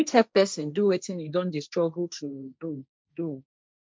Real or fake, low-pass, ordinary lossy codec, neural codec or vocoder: fake; none; none; codec, 16 kHz, 1.1 kbps, Voila-Tokenizer